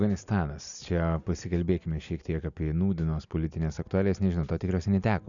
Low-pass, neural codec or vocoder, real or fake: 7.2 kHz; none; real